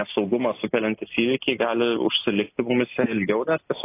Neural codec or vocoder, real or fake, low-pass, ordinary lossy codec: none; real; 3.6 kHz; AAC, 24 kbps